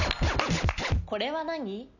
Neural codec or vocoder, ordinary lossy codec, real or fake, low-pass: none; none; real; 7.2 kHz